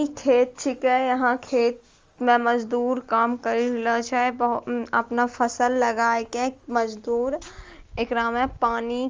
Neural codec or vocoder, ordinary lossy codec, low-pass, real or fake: none; Opus, 32 kbps; 7.2 kHz; real